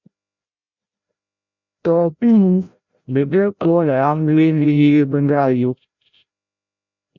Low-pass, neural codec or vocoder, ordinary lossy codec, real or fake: 7.2 kHz; codec, 16 kHz, 0.5 kbps, FreqCodec, larger model; Opus, 64 kbps; fake